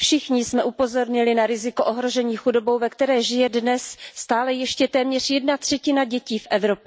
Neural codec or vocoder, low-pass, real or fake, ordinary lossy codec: none; none; real; none